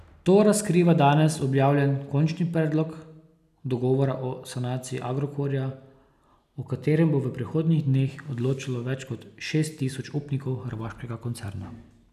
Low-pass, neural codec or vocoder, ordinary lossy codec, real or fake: 14.4 kHz; none; none; real